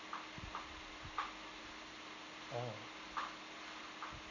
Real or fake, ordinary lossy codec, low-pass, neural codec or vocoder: real; none; 7.2 kHz; none